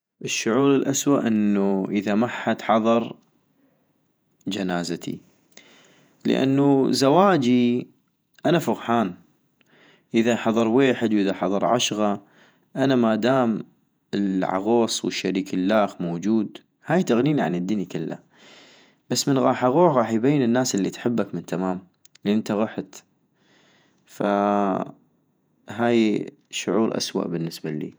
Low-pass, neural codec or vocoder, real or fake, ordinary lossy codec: none; vocoder, 48 kHz, 128 mel bands, Vocos; fake; none